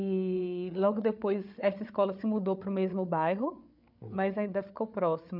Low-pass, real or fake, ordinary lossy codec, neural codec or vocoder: 5.4 kHz; fake; none; codec, 16 kHz, 8 kbps, FreqCodec, larger model